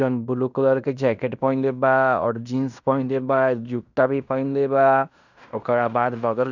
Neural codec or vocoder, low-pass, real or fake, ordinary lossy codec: codec, 16 kHz in and 24 kHz out, 0.9 kbps, LongCat-Audio-Codec, fine tuned four codebook decoder; 7.2 kHz; fake; none